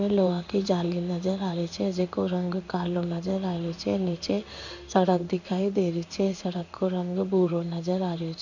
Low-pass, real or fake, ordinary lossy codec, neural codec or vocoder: 7.2 kHz; fake; none; codec, 16 kHz in and 24 kHz out, 1 kbps, XY-Tokenizer